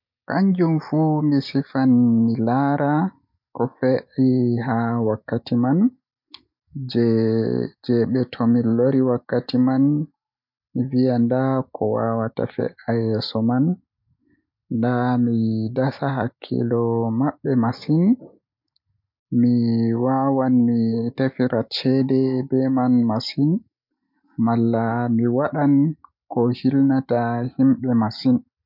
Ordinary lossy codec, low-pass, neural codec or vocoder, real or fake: MP3, 48 kbps; 5.4 kHz; none; real